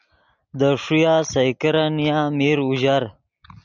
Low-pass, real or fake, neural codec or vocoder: 7.2 kHz; real; none